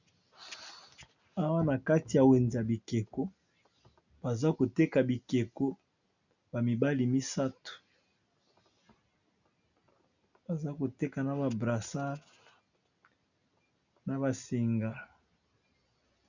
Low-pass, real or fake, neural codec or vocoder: 7.2 kHz; real; none